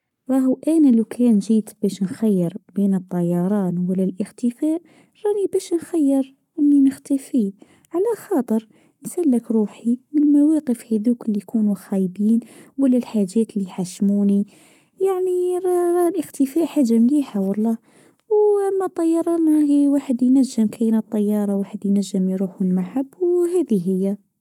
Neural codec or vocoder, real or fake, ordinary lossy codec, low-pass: codec, 44.1 kHz, 7.8 kbps, Pupu-Codec; fake; none; 19.8 kHz